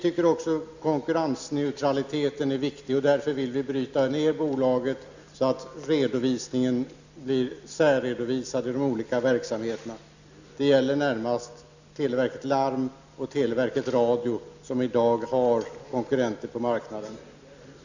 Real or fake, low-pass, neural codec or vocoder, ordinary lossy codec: real; 7.2 kHz; none; none